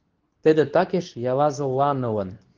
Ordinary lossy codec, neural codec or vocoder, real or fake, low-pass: Opus, 16 kbps; codec, 24 kHz, 0.9 kbps, WavTokenizer, medium speech release version 2; fake; 7.2 kHz